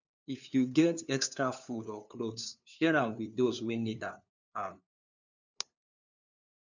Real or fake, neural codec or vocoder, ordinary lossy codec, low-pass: fake; codec, 16 kHz, 2 kbps, FunCodec, trained on LibriTTS, 25 frames a second; none; 7.2 kHz